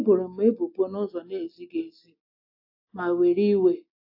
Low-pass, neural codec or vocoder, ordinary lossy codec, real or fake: 5.4 kHz; none; AAC, 48 kbps; real